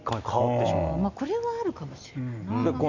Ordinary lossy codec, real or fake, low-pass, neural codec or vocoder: none; real; 7.2 kHz; none